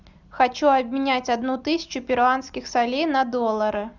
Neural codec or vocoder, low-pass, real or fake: none; 7.2 kHz; real